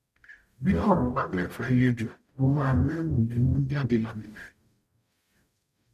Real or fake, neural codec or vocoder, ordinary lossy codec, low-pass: fake; codec, 44.1 kHz, 0.9 kbps, DAC; none; 14.4 kHz